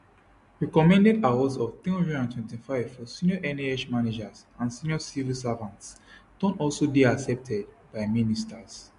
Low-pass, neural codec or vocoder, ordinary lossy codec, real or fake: 10.8 kHz; none; MP3, 64 kbps; real